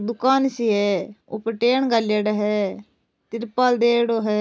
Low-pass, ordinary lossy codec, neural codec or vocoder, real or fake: none; none; none; real